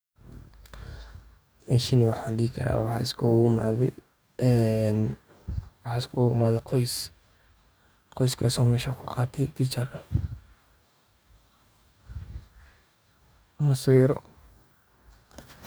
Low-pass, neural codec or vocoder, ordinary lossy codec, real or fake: none; codec, 44.1 kHz, 2.6 kbps, DAC; none; fake